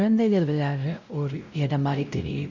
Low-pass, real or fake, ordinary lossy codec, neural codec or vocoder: 7.2 kHz; fake; none; codec, 16 kHz, 0.5 kbps, X-Codec, WavLM features, trained on Multilingual LibriSpeech